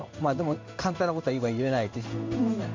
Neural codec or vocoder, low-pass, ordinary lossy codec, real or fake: codec, 16 kHz in and 24 kHz out, 1 kbps, XY-Tokenizer; 7.2 kHz; MP3, 64 kbps; fake